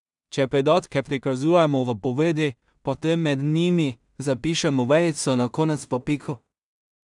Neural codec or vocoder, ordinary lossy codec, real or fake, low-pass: codec, 16 kHz in and 24 kHz out, 0.4 kbps, LongCat-Audio-Codec, two codebook decoder; none; fake; 10.8 kHz